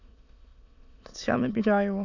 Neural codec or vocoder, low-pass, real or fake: autoencoder, 22.05 kHz, a latent of 192 numbers a frame, VITS, trained on many speakers; 7.2 kHz; fake